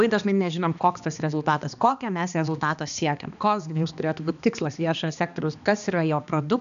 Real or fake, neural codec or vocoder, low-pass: fake; codec, 16 kHz, 2 kbps, X-Codec, HuBERT features, trained on balanced general audio; 7.2 kHz